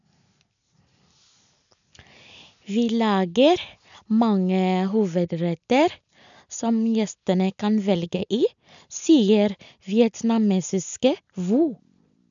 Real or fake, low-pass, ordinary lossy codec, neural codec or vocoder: real; 7.2 kHz; none; none